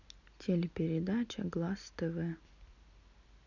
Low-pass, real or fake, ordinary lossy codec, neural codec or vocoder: 7.2 kHz; real; none; none